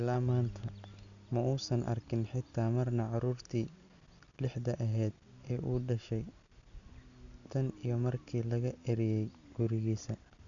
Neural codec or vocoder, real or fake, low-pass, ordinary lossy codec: none; real; 7.2 kHz; MP3, 96 kbps